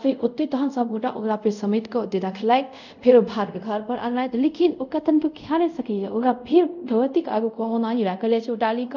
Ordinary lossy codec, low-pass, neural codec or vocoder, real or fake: none; 7.2 kHz; codec, 24 kHz, 0.5 kbps, DualCodec; fake